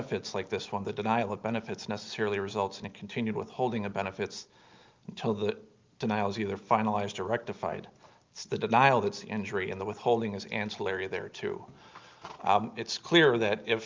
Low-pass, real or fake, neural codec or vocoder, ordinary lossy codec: 7.2 kHz; real; none; Opus, 24 kbps